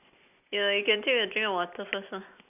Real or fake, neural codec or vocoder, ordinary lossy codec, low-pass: real; none; none; 3.6 kHz